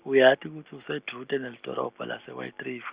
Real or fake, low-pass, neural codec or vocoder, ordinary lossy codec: real; 3.6 kHz; none; Opus, 64 kbps